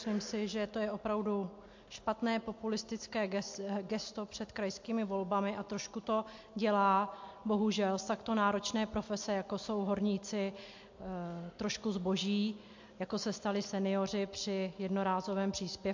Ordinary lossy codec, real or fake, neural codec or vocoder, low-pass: MP3, 48 kbps; real; none; 7.2 kHz